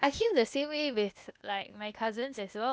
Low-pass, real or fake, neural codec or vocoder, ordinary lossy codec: none; fake; codec, 16 kHz, 0.8 kbps, ZipCodec; none